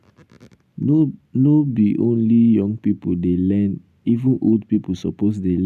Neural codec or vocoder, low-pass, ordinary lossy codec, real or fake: none; 14.4 kHz; none; real